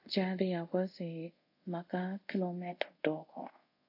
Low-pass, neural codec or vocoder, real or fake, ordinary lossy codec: 5.4 kHz; codec, 24 kHz, 0.5 kbps, DualCodec; fake; AAC, 32 kbps